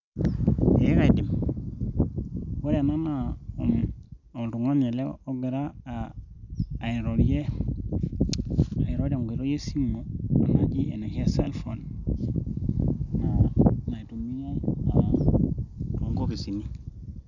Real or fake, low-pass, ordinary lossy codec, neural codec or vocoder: real; 7.2 kHz; none; none